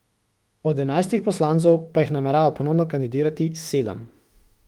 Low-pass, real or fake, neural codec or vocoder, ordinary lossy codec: 19.8 kHz; fake; autoencoder, 48 kHz, 32 numbers a frame, DAC-VAE, trained on Japanese speech; Opus, 24 kbps